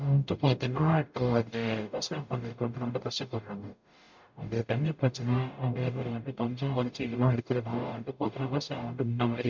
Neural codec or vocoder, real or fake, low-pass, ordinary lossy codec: codec, 44.1 kHz, 0.9 kbps, DAC; fake; 7.2 kHz; MP3, 64 kbps